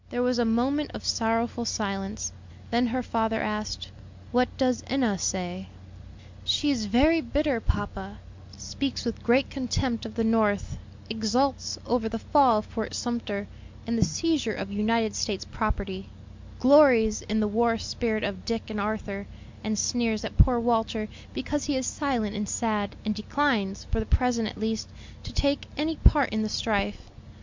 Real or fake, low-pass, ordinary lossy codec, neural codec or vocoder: real; 7.2 kHz; MP3, 64 kbps; none